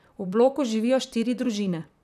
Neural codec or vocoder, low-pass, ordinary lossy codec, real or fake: vocoder, 44.1 kHz, 128 mel bands, Pupu-Vocoder; 14.4 kHz; none; fake